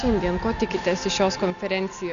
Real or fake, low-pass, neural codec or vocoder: real; 7.2 kHz; none